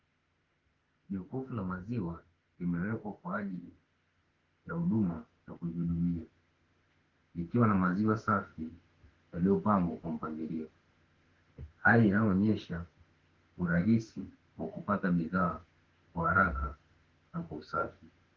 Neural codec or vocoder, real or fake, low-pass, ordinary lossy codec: autoencoder, 48 kHz, 32 numbers a frame, DAC-VAE, trained on Japanese speech; fake; 7.2 kHz; Opus, 16 kbps